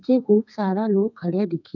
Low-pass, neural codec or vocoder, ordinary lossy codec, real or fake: 7.2 kHz; codec, 32 kHz, 1.9 kbps, SNAC; none; fake